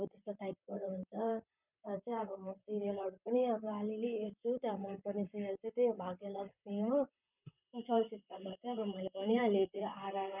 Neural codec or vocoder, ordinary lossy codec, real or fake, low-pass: vocoder, 44.1 kHz, 128 mel bands, Pupu-Vocoder; none; fake; 3.6 kHz